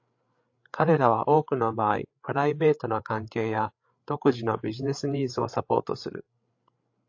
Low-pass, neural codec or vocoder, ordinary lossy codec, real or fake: 7.2 kHz; codec, 16 kHz, 8 kbps, FreqCodec, larger model; AAC, 48 kbps; fake